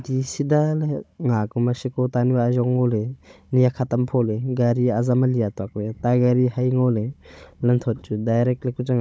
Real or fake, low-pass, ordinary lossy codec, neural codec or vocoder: fake; none; none; codec, 16 kHz, 16 kbps, FunCodec, trained on Chinese and English, 50 frames a second